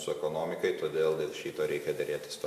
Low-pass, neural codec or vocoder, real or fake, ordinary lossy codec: 14.4 kHz; none; real; AAC, 96 kbps